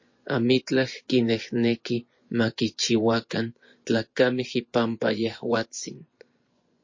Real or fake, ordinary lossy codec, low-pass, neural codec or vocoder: fake; MP3, 32 kbps; 7.2 kHz; vocoder, 24 kHz, 100 mel bands, Vocos